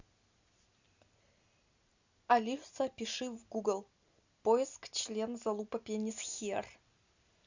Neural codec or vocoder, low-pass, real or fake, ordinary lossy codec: none; 7.2 kHz; real; Opus, 64 kbps